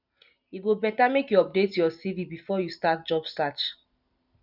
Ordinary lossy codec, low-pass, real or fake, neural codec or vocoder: none; 5.4 kHz; real; none